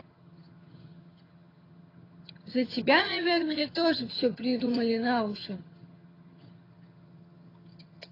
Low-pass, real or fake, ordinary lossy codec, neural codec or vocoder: 5.4 kHz; fake; AAC, 24 kbps; vocoder, 22.05 kHz, 80 mel bands, HiFi-GAN